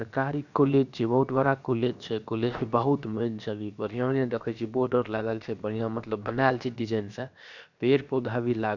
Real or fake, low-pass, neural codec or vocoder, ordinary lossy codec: fake; 7.2 kHz; codec, 16 kHz, 0.7 kbps, FocalCodec; none